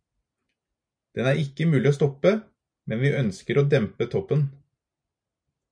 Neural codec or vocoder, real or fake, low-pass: none; real; 9.9 kHz